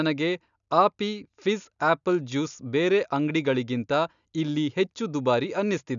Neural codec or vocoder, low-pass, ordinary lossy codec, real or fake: none; 7.2 kHz; none; real